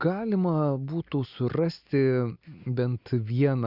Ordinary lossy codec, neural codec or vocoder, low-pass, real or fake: Opus, 64 kbps; none; 5.4 kHz; real